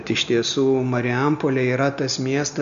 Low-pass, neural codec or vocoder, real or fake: 7.2 kHz; none; real